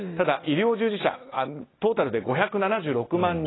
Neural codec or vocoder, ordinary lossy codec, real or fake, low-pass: none; AAC, 16 kbps; real; 7.2 kHz